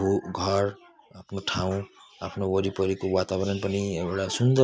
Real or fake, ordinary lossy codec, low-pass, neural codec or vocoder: real; none; none; none